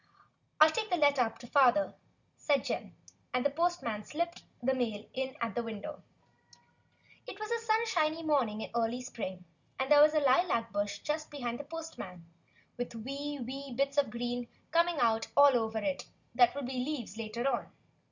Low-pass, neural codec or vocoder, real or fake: 7.2 kHz; none; real